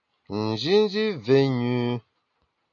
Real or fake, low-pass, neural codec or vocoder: real; 7.2 kHz; none